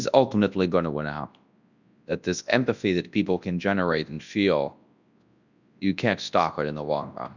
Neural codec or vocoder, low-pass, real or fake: codec, 24 kHz, 0.9 kbps, WavTokenizer, large speech release; 7.2 kHz; fake